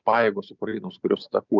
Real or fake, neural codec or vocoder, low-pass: real; none; 7.2 kHz